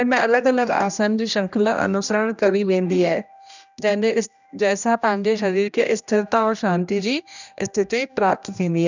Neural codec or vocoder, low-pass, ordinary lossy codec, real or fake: codec, 16 kHz, 1 kbps, X-Codec, HuBERT features, trained on general audio; 7.2 kHz; none; fake